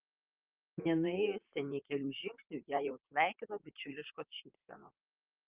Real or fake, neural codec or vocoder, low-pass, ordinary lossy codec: fake; vocoder, 44.1 kHz, 128 mel bands, Pupu-Vocoder; 3.6 kHz; Opus, 24 kbps